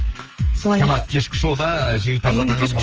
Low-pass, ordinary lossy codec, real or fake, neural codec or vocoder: 7.2 kHz; Opus, 24 kbps; fake; codec, 44.1 kHz, 2.6 kbps, SNAC